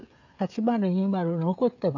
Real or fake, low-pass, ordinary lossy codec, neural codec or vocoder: fake; 7.2 kHz; none; codec, 16 kHz, 16 kbps, FreqCodec, smaller model